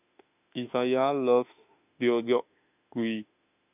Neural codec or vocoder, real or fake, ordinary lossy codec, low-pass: autoencoder, 48 kHz, 32 numbers a frame, DAC-VAE, trained on Japanese speech; fake; none; 3.6 kHz